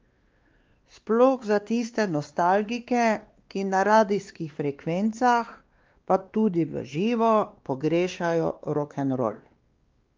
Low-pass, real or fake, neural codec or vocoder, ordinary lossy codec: 7.2 kHz; fake; codec, 16 kHz, 2 kbps, X-Codec, WavLM features, trained on Multilingual LibriSpeech; Opus, 24 kbps